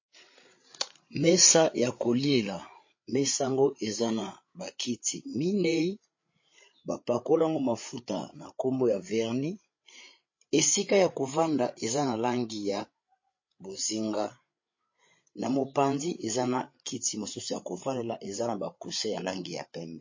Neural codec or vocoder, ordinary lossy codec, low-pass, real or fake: codec, 16 kHz, 8 kbps, FreqCodec, larger model; MP3, 32 kbps; 7.2 kHz; fake